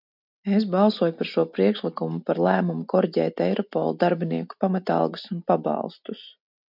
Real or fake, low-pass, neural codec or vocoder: real; 5.4 kHz; none